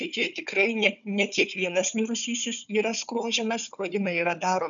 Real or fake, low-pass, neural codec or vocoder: fake; 7.2 kHz; codec, 16 kHz, 4 kbps, FunCodec, trained on Chinese and English, 50 frames a second